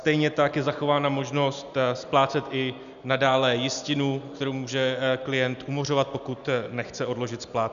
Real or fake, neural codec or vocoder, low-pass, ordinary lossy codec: real; none; 7.2 kHz; MP3, 96 kbps